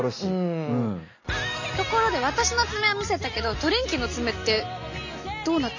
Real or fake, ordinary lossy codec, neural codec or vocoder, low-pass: real; none; none; 7.2 kHz